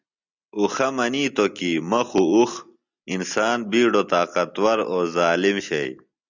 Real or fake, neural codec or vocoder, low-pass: real; none; 7.2 kHz